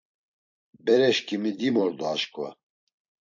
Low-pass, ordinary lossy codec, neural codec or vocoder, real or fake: 7.2 kHz; MP3, 48 kbps; vocoder, 44.1 kHz, 128 mel bands every 256 samples, BigVGAN v2; fake